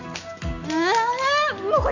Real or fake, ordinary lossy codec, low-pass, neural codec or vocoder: real; none; 7.2 kHz; none